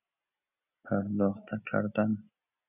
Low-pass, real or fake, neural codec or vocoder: 3.6 kHz; real; none